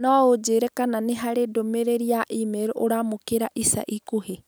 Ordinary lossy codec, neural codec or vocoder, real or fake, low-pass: none; none; real; none